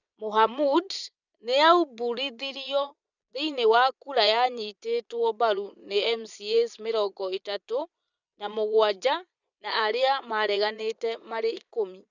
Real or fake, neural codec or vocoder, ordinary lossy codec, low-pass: fake; vocoder, 22.05 kHz, 80 mel bands, Vocos; none; 7.2 kHz